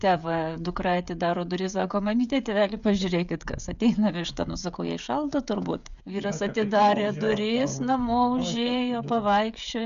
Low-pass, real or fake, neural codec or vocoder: 7.2 kHz; fake; codec, 16 kHz, 16 kbps, FreqCodec, smaller model